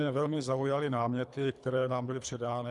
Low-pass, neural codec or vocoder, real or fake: 10.8 kHz; codec, 24 kHz, 3 kbps, HILCodec; fake